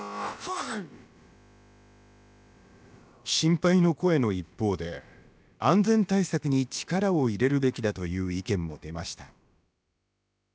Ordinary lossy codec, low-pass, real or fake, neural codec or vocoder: none; none; fake; codec, 16 kHz, about 1 kbps, DyCAST, with the encoder's durations